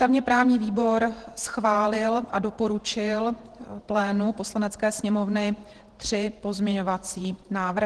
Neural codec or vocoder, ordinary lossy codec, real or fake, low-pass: vocoder, 48 kHz, 128 mel bands, Vocos; Opus, 16 kbps; fake; 10.8 kHz